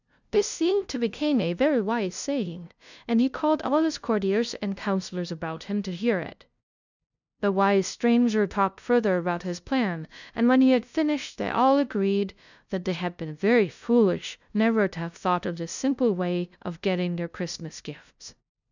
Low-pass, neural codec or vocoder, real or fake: 7.2 kHz; codec, 16 kHz, 0.5 kbps, FunCodec, trained on LibriTTS, 25 frames a second; fake